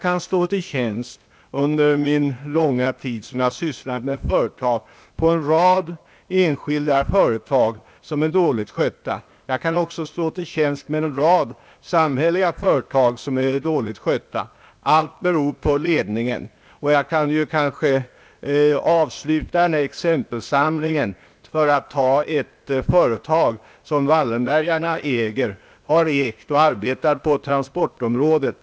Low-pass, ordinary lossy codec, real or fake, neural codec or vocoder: none; none; fake; codec, 16 kHz, 0.8 kbps, ZipCodec